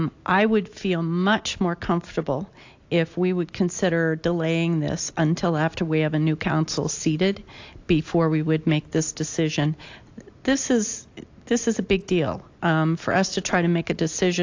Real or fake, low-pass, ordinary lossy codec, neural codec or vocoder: real; 7.2 kHz; AAC, 48 kbps; none